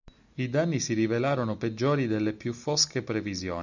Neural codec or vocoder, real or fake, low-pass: none; real; 7.2 kHz